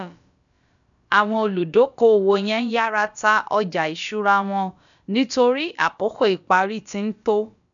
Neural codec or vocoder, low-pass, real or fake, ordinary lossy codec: codec, 16 kHz, about 1 kbps, DyCAST, with the encoder's durations; 7.2 kHz; fake; none